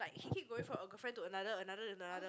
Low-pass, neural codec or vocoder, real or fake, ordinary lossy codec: none; none; real; none